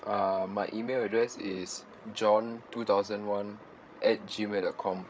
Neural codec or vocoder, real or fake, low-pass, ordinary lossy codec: codec, 16 kHz, 16 kbps, FreqCodec, larger model; fake; none; none